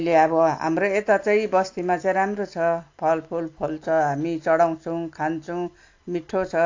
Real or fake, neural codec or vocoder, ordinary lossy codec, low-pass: real; none; AAC, 48 kbps; 7.2 kHz